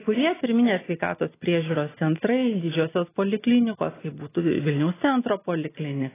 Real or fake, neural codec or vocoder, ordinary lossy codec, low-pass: real; none; AAC, 16 kbps; 3.6 kHz